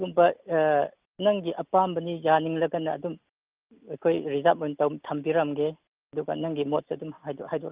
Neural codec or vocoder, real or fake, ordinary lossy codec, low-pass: none; real; Opus, 24 kbps; 3.6 kHz